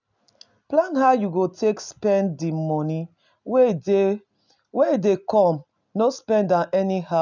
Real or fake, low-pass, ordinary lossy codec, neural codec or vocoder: real; 7.2 kHz; none; none